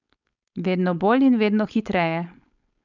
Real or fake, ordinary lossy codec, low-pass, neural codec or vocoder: fake; none; 7.2 kHz; codec, 16 kHz, 4.8 kbps, FACodec